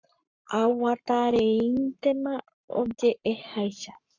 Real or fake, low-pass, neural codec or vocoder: fake; 7.2 kHz; codec, 44.1 kHz, 7.8 kbps, Pupu-Codec